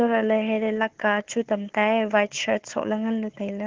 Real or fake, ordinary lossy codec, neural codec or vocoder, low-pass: fake; Opus, 16 kbps; codec, 16 kHz, 4 kbps, FunCodec, trained on Chinese and English, 50 frames a second; 7.2 kHz